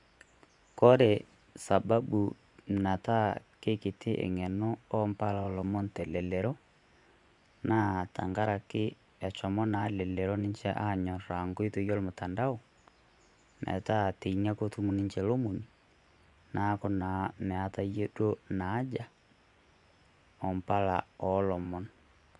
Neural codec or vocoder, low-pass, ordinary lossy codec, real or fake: vocoder, 24 kHz, 100 mel bands, Vocos; 10.8 kHz; none; fake